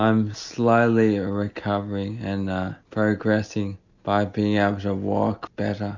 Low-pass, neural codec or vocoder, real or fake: 7.2 kHz; none; real